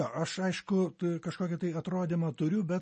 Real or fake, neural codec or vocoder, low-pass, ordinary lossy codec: real; none; 10.8 kHz; MP3, 32 kbps